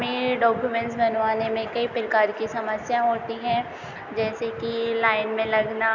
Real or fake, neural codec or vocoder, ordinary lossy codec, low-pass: real; none; none; 7.2 kHz